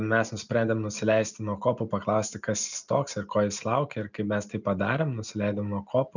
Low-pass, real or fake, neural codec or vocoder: 7.2 kHz; real; none